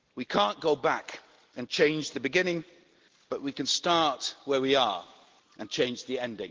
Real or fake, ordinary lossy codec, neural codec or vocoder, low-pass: real; Opus, 16 kbps; none; 7.2 kHz